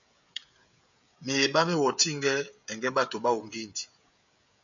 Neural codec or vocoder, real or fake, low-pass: codec, 16 kHz, 16 kbps, FreqCodec, smaller model; fake; 7.2 kHz